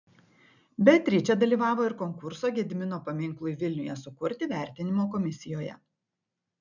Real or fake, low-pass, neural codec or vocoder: real; 7.2 kHz; none